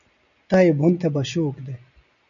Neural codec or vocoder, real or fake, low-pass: none; real; 7.2 kHz